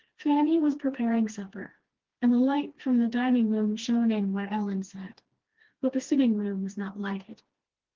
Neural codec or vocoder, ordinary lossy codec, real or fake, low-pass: codec, 16 kHz, 2 kbps, FreqCodec, smaller model; Opus, 16 kbps; fake; 7.2 kHz